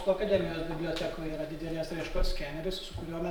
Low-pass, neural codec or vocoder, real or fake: 19.8 kHz; vocoder, 44.1 kHz, 128 mel bands every 512 samples, BigVGAN v2; fake